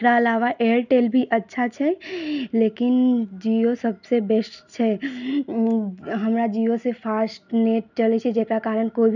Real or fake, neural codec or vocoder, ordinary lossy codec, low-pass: real; none; none; 7.2 kHz